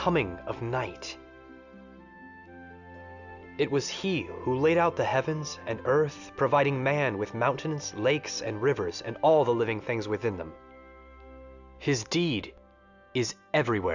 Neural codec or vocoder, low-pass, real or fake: none; 7.2 kHz; real